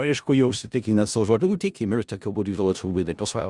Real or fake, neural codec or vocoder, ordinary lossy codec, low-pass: fake; codec, 16 kHz in and 24 kHz out, 0.4 kbps, LongCat-Audio-Codec, four codebook decoder; Opus, 64 kbps; 10.8 kHz